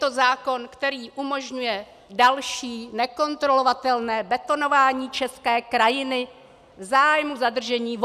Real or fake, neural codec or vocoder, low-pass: real; none; 14.4 kHz